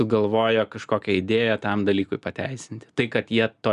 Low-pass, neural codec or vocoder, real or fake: 10.8 kHz; none; real